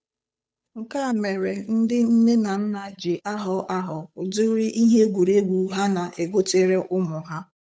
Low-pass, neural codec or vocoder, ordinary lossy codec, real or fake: none; codec, 16 kHz, 8 kbps, FunCodec, trained on Chinese and English, 25 frames a second; none; fake